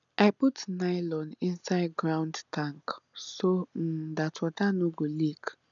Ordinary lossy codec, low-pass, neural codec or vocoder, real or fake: none; 7.2 kHz; none; real